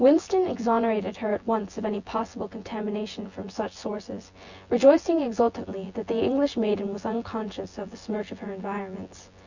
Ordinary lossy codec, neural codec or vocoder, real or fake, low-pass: Opus, 64 kbps; vocoder, 24 kHz, 100 mel bands, Vocos; fake; 7.2 kHz